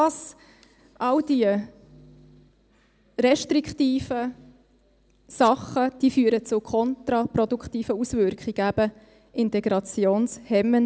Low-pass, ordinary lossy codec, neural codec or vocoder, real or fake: none; none; none; real